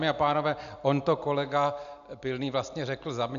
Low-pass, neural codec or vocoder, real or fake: 7.2 kHz; none; real